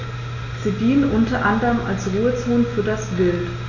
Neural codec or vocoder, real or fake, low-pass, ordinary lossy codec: none; real; 7.2 kHz; none